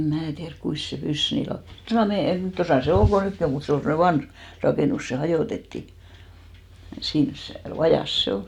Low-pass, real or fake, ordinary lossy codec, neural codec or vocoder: 19.8 kHz; real; none; none